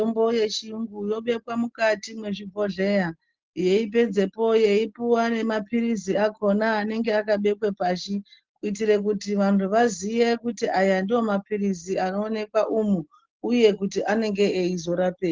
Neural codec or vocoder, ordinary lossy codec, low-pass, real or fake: none; Opus, 16 kbps; 7.2 kHz; real